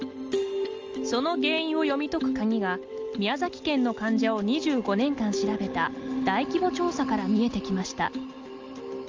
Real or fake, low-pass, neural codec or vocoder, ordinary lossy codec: real; 7.2 kHz; none; Opus, 24 kbps